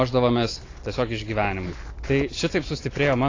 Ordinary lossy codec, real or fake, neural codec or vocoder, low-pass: AAC, 32 kbps; real; none; 7.2 kHz